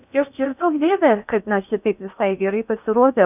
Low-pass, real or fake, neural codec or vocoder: 3.6 kHz; fake; codec, 16 kHz in and 24 kHz out, 0.6 kbps, FocalCodec, streaming, 4096 codes